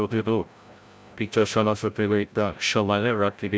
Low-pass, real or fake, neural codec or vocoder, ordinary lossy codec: none; fake; codec, 16 kHz, 0.5 kbps, FreqCodec, larger model; none